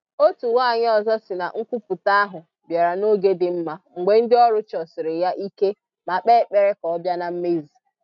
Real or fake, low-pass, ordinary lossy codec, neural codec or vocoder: real; 7.2 kHz; none; none